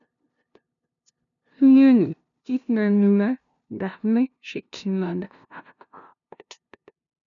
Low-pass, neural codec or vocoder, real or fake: 7.2 kHz; codec, 16 kHz, 0.5 kbps, FunCodec, trained on LibriTTS, 25 frames a second; fake